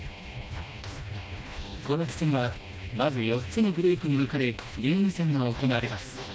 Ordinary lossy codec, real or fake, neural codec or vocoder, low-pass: none; fake; codec, 16 kHz, 1 kbps, FreqCodec, smaller model; none